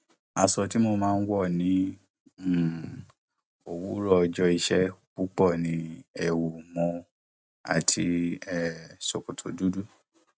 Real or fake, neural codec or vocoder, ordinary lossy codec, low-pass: real; none; none; none